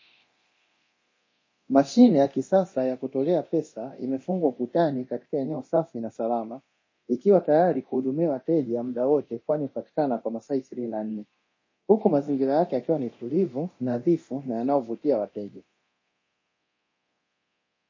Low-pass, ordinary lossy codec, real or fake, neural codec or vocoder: 7.2 kHz; MP3, 32 kbps; fake; codec, 24 kHz, 0.9 kbps, DualCodec